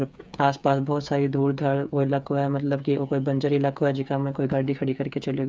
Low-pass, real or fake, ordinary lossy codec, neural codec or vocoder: none; fake; none; codec, 16 kHz, 8 kbps, FreqCodec, smaller model